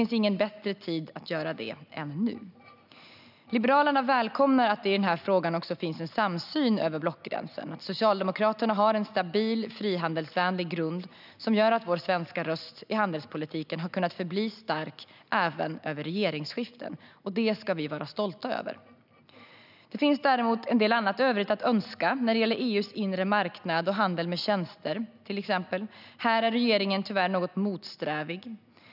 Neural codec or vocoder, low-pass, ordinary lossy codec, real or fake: none; 5.4 kHz; none; real